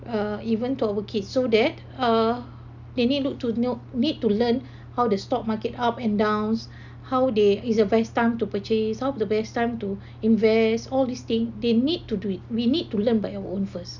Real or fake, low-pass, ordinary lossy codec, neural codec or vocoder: real; 7.2 kHz; none; none